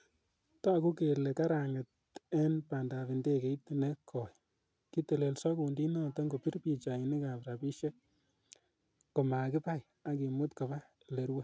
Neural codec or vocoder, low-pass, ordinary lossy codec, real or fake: none; none; none; real